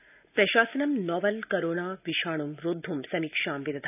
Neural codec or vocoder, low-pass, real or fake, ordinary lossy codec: none; 3.6 kHz; real; none